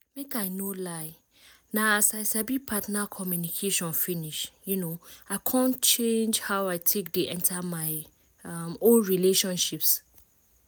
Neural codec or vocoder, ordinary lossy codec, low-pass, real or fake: none; none; none; real